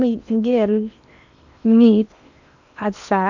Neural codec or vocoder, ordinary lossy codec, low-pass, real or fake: codec, 16 kHz in and 24 kHz out, 0.8 kbps, FocalCodec, streaming, 65536 codes; none; 7.2 kHz; fake